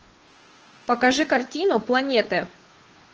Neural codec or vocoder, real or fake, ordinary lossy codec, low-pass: codec, 16 kHz, 2 kbps, FunCodec, trained on Chinese and English, 25 frames a second; fake; Opus, 16 kbps; 7.2 kHz